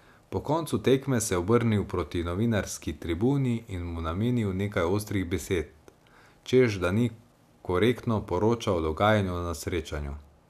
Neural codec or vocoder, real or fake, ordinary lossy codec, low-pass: none; real; none; 14.4 kHz